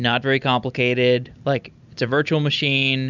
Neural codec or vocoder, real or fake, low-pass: none; real; 7.2 kHz